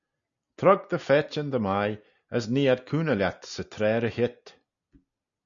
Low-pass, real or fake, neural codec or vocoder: 7.2 kHz; real; none